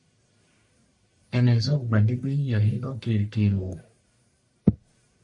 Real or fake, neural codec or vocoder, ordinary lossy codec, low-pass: fake; codec, 44.1 kHz, 1.7 kbps, Pupu-Codec; MP3, 48 kbps; 10.8 kHz